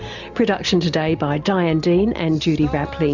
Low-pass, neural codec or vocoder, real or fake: 7.2 kHz; none; real